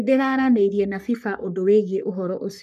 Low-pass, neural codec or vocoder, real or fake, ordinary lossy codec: 14.4 kHz; codec, 44.1 kHz, 3.4 kbps, Pupu-Codec; fake; none